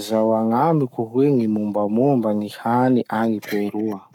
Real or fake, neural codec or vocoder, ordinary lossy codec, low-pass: fake; codec, 44.1 kHz, 7.8 kbps, Pupu-Codec; none; 19.8 kHz